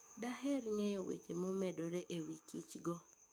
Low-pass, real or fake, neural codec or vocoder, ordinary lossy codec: none; fake; codec, 44.1 kHz, 7.8 kbps, DAC; none